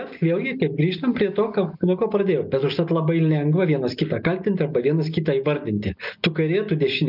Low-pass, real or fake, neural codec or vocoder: 5.4 kHz; real; none